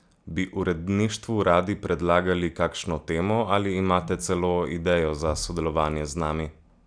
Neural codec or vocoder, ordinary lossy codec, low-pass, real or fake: none; none; 9.9 kHz; real